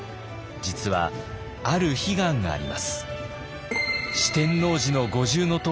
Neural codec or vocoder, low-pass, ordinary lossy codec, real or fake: none; none; none; real